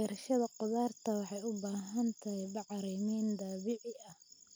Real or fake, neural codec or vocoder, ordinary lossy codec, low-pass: real; none; none; none